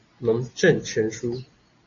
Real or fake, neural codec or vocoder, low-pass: real; none; 7.2 kHz